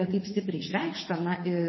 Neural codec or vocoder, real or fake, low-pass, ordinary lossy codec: vocoder, 44.1 kHz, 80 mel bands, Vocos; fake; 7.2 kHz; MP3, 24 kbps